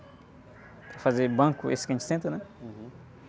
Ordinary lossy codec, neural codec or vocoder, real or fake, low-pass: none; none; real; none